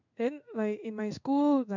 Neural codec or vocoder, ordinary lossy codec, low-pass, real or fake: codec, 16 kHz in and 24 kHz out, 1 kbps, XY-Tokenizer; none; 7.2 kHz; fake